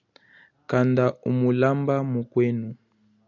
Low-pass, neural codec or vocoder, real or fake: 7.2 kHz; none; real